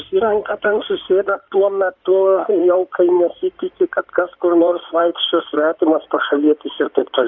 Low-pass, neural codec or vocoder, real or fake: 7.2 kHz; codec, 16 kHz in and 24 kHz out, 2.2 kbps, FireRedTTS-2 codec; fake